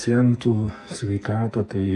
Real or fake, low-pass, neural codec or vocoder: fake; 10.8 kHz; codec, 44.1 kHz, 2.6 kbps, SNAC